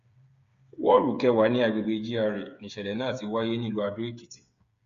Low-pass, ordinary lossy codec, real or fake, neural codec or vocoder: 7.2 kHz; Opus, 64 kbps; fake; codec, 16 kHz, 8 kbps, FreqCodec, smaller model